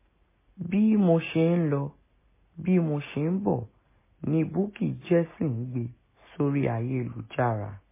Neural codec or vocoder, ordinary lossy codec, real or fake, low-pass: none; MP3, 16 kbps; real; 3.6 kHz